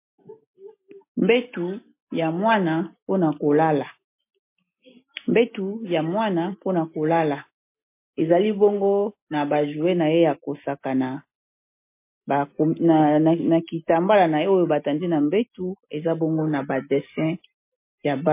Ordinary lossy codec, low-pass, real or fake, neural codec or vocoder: MP3, 24 kbps; 3.6 kHz; real; none